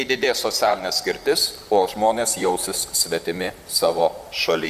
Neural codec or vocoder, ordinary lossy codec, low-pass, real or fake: vocoder, 44.1 kHz, 128 mel bands, Pupu-Vocoder; Opus, 64 kbps; 14.4 kHz; fake